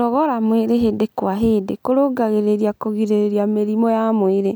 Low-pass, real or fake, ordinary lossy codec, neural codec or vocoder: none; real; none; none